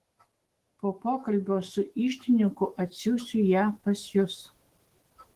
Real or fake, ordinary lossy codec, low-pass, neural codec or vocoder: fake; Opus, 16 kbps; 14.4 kHz; autoencoder, 48 kHz, 128 numbers a frame, DAC-VAE, trained on Japanese speech